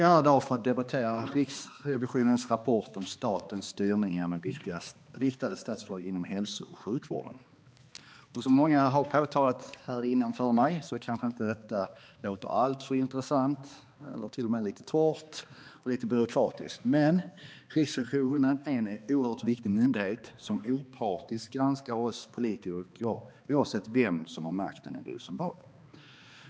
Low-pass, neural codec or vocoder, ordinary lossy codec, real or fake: none; codec, 16 kHz, 2 kbps, X-Codec, HuBERT features, trained on balanced general audio; none; fake